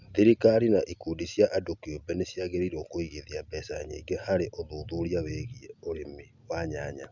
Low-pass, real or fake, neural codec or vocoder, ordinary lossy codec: 7.2 kHz; real; none; none